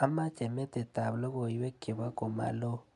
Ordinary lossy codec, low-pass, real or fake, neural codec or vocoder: AAC, 96 kbps; 10.8 kHz; real; none